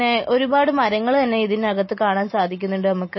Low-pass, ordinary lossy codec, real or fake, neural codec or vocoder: 7.2 kHz; MP3, 24 kbps; real; none